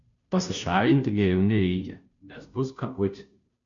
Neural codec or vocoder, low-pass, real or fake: codec, 16 kHz, 0.5 kbps, FunCodec, trained on Chinese and English, 25 frames a second; 7.2 kHz; fake